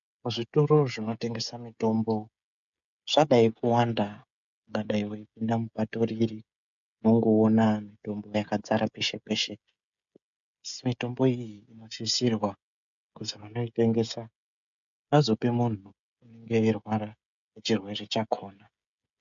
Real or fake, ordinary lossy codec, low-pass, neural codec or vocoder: fake; MP3, 64 kbps; 7.2 kHz; codec, 16 kHz, 16 kbps, FreqCodec, smaller model